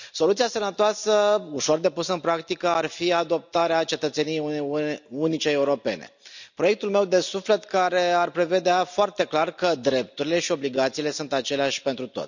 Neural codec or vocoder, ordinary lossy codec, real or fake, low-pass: none; none; real; 7.2 kHz